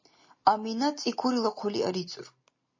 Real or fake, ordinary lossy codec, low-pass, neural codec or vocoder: real; MP3, 32 kbps; 7.2 kHz; none